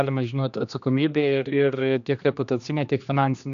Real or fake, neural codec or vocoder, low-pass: fake; codec, 16 kHz, 2 kbps, X-Codec, HuBERT features, trained on general audio; 7.2 kHz